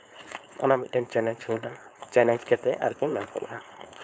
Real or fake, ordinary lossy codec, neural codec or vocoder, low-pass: fake; none; codec, 16 kHz, 4.8 kbps, FACodec; none